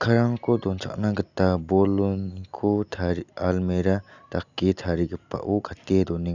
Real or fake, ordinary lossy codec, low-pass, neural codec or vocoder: real; none; 7.2 kHz; none